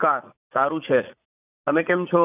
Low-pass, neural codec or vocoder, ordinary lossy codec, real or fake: 3.6 kHz; vocoder, 44.1 kHz, 80 mel bands, Vocos; none; fake